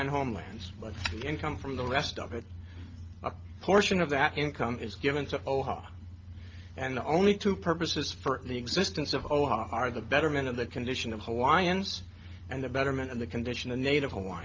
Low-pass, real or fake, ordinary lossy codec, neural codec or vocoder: 7.2 kHz; real; Opus, 32 kbps; none